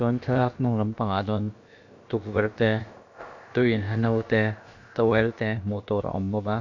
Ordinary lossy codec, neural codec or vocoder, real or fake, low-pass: MP3, 64 kbps; codec, 16 kHz, about 1 kbps, DyCAST, with the encoder's durations; fake; 7.2 kHz